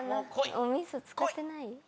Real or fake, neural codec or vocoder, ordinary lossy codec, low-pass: real; none; none; none